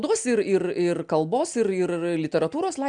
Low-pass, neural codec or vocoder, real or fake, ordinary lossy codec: 9.9 kHz; none; real; MP3, 96 kbps